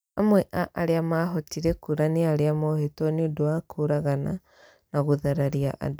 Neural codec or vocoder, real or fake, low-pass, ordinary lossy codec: none; real; none; none